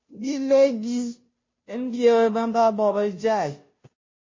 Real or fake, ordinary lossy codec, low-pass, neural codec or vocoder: fake; MP3, 32 kbps; 7.2 kHz; codec, 16 kHz, 0.5 kbps, FunCodec, trained on Chinese and English, 25 frames a second